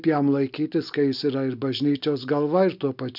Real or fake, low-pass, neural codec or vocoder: real; 5.4 kHz; none